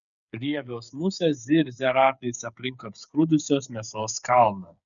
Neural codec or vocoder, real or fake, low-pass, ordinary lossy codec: codec, 16 kHz, 8 kbps, FreqCodec, smaller model; fake; 7.2 kHz; MP3, 96 kbps